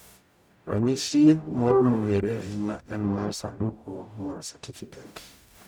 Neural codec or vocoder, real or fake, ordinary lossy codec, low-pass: codec, 44.1 kHz, 0.9 kbps, DAC; fake; none; none